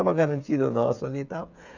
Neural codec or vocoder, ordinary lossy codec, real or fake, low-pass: codec, 16 kHz, 8 kbps, FreqCodec, smaller model; none; fake; 7.2 kHz